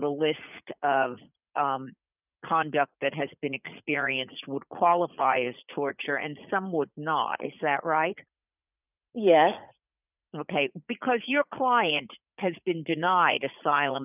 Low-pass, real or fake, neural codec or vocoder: 3.6 kHz; fake; vocoder, 44.1 kHz, 80 mel bands, Vocos